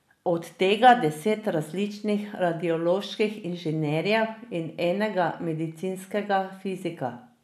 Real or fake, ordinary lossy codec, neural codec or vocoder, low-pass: real; none; none; 14.4 kHz